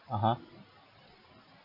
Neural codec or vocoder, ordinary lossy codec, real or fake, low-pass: none; none; real; 5.4 kHz